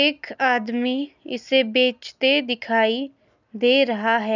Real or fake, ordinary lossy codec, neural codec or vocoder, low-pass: real; none; none; 7.2 kHz